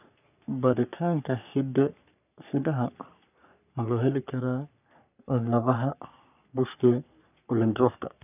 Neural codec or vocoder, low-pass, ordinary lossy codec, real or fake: codec, 44.1 kHz, 3.4 kbps, Pupu-Codec; 3.6 kHz; none; fake